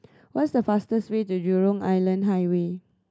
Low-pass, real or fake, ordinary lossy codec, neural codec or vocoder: none; real; none; none